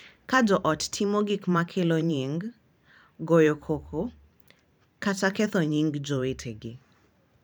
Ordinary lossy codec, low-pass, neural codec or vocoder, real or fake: none; none; vocoder, 44.1 kHz, 128 mel bands every 512 samples, BigVGAN v2; fake